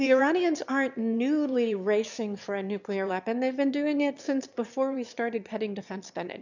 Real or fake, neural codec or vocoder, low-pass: fake; autoencoder, 22.05 kHz, a latent of 192 numbers a frame, VITS, trained on one speaker; 7.2 kHz